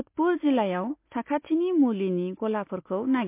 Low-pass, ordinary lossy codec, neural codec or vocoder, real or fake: 3.6 kHz; MP3, 24 kbps; none; real